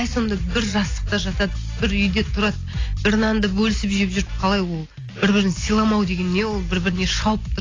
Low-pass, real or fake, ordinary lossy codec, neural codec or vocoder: 7.2 kHz; real; AAC, 32 kbps; none